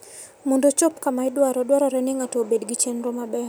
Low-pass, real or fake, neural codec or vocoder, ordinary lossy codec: none; real; none; none